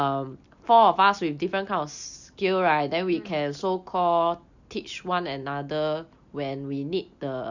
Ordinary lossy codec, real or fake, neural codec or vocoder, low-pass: none; real; none; 7.2 kHz